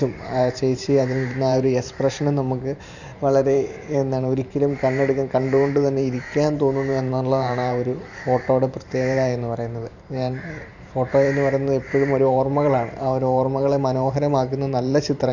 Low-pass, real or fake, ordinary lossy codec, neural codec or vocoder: 7.2 kHz; fake; none; vocoder, 44.1 kHz, 128 mel bands every 512 samples, BigVGAN v2